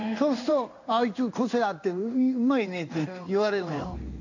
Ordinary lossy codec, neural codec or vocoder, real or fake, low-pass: none; codec, 16 kHz in and 24 kHz out, 1 kbps, XY-Tokenizer; fake; 7.2 kHz